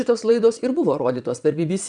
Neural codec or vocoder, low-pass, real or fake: vocoder, 22.05 kHz, 80 mel bands, Vocos; 9.9 kHz; fake